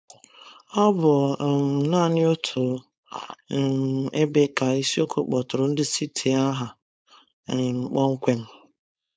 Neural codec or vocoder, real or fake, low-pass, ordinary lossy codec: codec, 16 kHz, 4.8 kbps, FACodec; fake; none; none